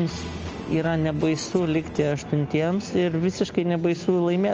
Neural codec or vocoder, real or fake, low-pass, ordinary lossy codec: none; real; 7.2 kHz; Opus, 24 kbps